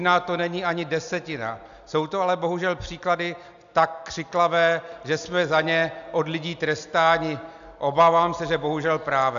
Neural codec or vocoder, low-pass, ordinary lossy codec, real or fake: none; 7.2 kHz; AAC, 96 kbps; real